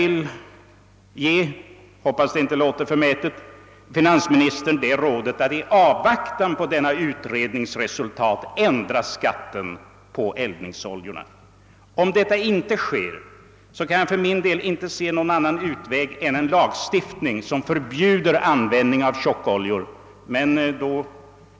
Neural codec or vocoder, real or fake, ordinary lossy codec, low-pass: none; real; none; none